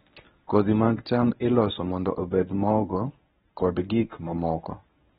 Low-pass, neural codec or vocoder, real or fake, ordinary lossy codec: 10.8 kHz; codec, 24 kHz, 0.9 kbps, WavTokenizer, medium speech release version 1; fake; AAC, 16 kbps